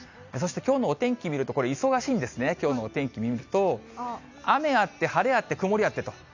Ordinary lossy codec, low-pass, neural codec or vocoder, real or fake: none; 7.2 kHz; none; real